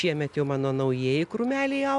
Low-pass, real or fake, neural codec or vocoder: 10.8 kHz; real; none